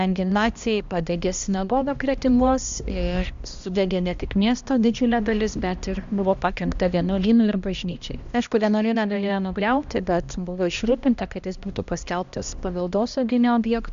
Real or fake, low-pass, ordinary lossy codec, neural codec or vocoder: fake; 7.2 kHz; Opus, 64 kbps; codec, 16 kHz, 1 kbps, X-Codec, HuBERT features, trained on balanced general audio